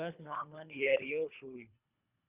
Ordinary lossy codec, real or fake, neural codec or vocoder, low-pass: Opus, 64 kbps; fake; codec, 24 kHz, 3 kbps, HILCodec; 3.6 kHz